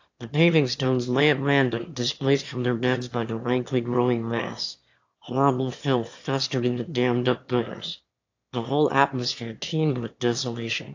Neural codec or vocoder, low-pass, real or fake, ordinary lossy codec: autoencoder, 22.05 kHz, a latent of 192 numbers a frame, VITS, trained on one speaker; 7.2 kHz; fake; AAC, 48 kbps